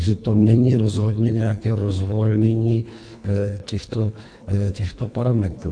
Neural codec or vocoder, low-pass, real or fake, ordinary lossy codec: codec, 24 kHz, 1.5 kbps, HILCodec; 9.9 kHz; fake; AAC, 64 kbps